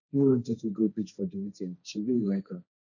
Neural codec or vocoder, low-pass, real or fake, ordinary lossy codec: codec, 16 kHz, 1.1 kbps, Voila-Tokenizer; 7.2 kHz; fake; none